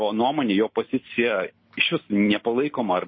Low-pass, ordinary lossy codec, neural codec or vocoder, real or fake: 7.2 kHz; MP3, 24 kbps; none; real